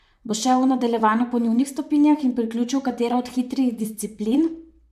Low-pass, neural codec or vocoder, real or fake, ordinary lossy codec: 14.4 kHz; vocoder, 44.1 kHz, 128 mel bands, Pupu-Vocoder; fake; MP3, 96 kbps